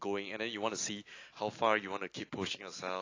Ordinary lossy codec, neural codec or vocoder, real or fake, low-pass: AAC, 32 kbps; none; real; 7.2 kHz